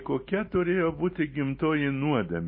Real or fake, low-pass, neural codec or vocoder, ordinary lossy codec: real; 5.4 kHz; none; MP3, 24 kbps